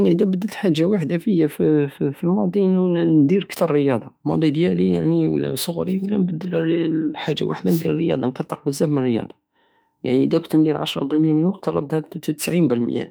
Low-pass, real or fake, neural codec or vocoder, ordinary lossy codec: none; fake; autoencoder, 48 kHz, 32 numbers a frame, DAC-VAE, trained on Japanese speech; none